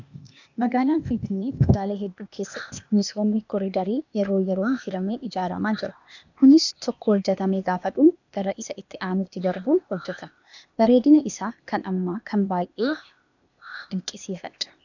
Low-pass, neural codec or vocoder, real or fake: 7.2 kHz; codec, 16 kHz, 0.8 kbps, ZipCodec; fake